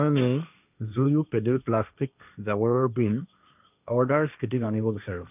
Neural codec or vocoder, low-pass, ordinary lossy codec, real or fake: codec, 16 kHz, 1.1 kbps, Voila-Tokenizer; 3.6 kHz; none; fake